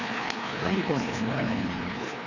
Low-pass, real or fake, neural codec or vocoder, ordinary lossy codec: 7.2 kHz; fake; codec, 16 kHz, 2 kbps, FreqCodec, larger model; none